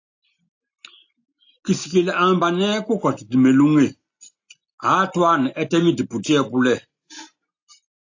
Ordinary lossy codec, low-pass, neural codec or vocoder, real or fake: AAC, 48 kbps; 7.2 kHz; none; real